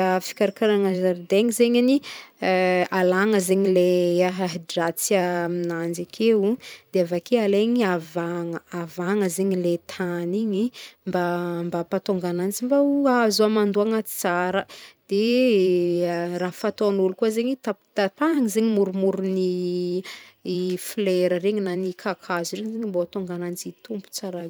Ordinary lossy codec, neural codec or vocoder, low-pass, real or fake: none; vocoder, 44.1 kHz, 128 mel bands every 512 samples, BigVGAN v2; none; fake